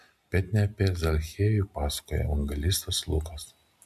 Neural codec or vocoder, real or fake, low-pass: none; real; 14.4 kHz